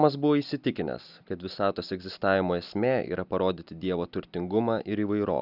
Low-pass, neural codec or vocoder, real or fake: 5.4 kHz; none; real